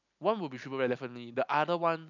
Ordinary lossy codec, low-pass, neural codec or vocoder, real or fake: none; 7.2 kHz; none; real